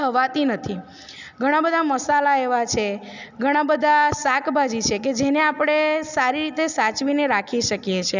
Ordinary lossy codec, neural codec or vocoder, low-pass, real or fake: none; none; 7.2 kHz; real